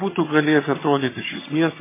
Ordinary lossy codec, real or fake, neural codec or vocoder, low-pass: MP3, 16 kbps; fake; vocoder, 22.05 kHz, 80 mel bands, HiFi-GAN; 3.6 kHz